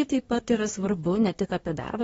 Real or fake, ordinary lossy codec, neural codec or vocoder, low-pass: fake; AAC, 24 kbps; codec, 24 kHz, 1 kbps, SNAC; 10.8 kHz